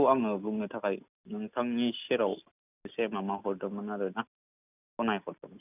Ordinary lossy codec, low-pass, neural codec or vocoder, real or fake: none; 3.6 kHz; none; real